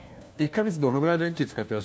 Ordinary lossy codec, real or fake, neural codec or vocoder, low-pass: none; fake; codec, 16 kHz, 1 kbps, FunCodec, trained on LibriTTS, 50 frames a second; none